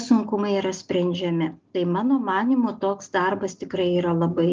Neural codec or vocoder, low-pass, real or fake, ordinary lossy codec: none; 7.2 kHz; real; Opus, 24 kbps